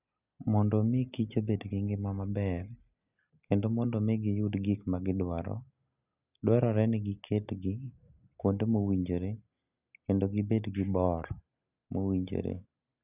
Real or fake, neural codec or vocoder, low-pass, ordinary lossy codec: real; none; 3.6 kHz; none